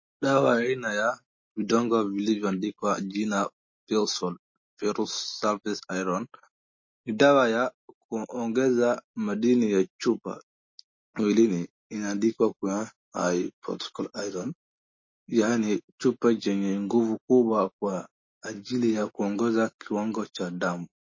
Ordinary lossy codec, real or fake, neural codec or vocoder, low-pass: MP3, 32 kbps; real; none; 7.2 kHz